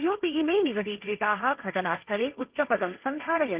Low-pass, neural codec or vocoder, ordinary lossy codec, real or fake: 3.6 kHz; codec, 16 kHz, 1.1 kbps, Voila-Tokenizer; Opus, 24 kbps; fake